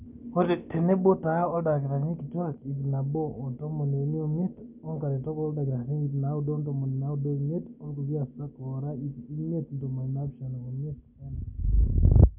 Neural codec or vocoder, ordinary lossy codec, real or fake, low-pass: none; none; real; 3.6 kHz